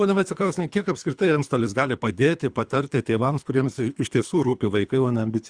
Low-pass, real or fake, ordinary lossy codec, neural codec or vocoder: 9.9 kHz; fake; Opus, 64 kbps; codec, 24 kHz, 3 kbps, HILCodec